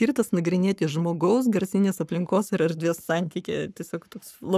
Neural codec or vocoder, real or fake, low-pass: codec, 44.1 kHz, 7.8 kbps, Pupu-Codec; fake; 14.4 kHz